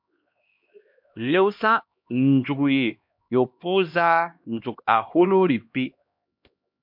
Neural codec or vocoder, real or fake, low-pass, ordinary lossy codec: codec, 16 kHz, 1 kbps, X-Codec, HuBERT features, trained on LibriSpeech; fake; 5.4 kHz; MP3, 48 kbps